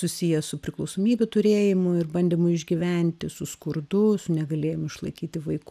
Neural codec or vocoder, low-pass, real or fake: none; 14.4 kHz; real